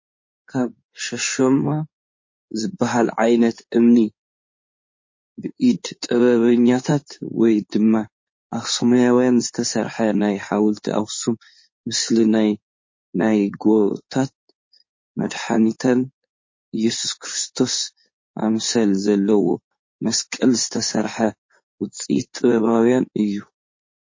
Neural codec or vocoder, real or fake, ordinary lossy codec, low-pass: codec, 16 kHz in and 24 kHz out, 2.2 kbps, FireRedTTS-2 codec; fake; MP3, 32 kbps; 7.2 kHz